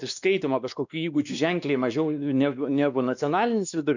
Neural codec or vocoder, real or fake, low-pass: codec, 16 kHz, 2 kbps, X-Codec, WavLM features, trained on Multilingual LibriSpeech; fake; 7.2 kHz